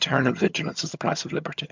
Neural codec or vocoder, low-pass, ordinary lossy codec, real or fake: vocoder, 22.05 kHz, 80 mel bands, HiFi-GAN; 7.2 kHz; MP3, 64 kbps; fake